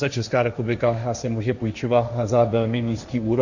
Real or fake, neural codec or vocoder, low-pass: fake; codec, 16 kHz, 1.1 kbps, Voila-Tokenizer; 7.2 kHz